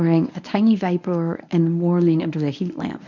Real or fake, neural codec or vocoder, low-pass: fake; codec, 24 kHz, 0.9 kbps, WavTokenizer, medium speech release version 1; 7.2 kHz